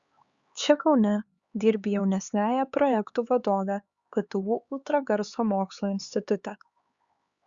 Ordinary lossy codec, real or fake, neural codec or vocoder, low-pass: Opus, 64 kbps; fake; codec, 16 kHz, 4 kbps, X-Codec, HuBERT features, trained on LibriSpeech; 7.2 kHz